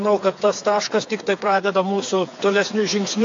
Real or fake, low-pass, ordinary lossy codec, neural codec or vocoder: fake; 7.2 kHz; AAC, 48 kbps; codec, 16 kHz, 4 kbps, FreqCodec, smaller model